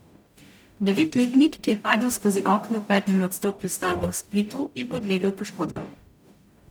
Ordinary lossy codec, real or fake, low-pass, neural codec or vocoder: none; fake; none; codec, 44.1 kHz, 0.9 kbps, DAC